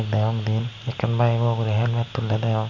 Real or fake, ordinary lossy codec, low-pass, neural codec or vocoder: real; MP3, 48 kbps; 7.2 kHz; none